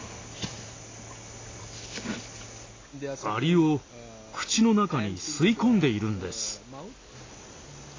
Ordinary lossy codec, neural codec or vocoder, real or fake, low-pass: AAC, 32 kbps; none; real; 7.2 kHz